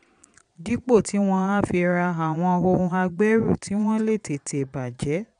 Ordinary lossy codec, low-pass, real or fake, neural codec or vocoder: none; 9.9 kHz; fake; vocoder, 22.05 kHz, 80 mel bands, Vocos